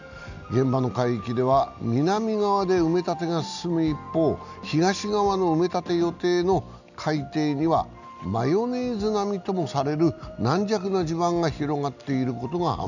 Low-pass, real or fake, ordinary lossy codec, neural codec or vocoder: 7.2 kHz; real; none; none